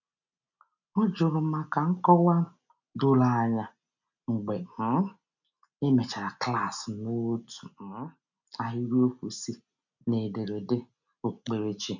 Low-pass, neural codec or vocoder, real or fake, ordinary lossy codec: 7.2 kHz; none; real; none